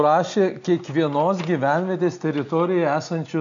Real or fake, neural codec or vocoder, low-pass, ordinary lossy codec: real; none; 7.2 kHz; MP3, 64 kbps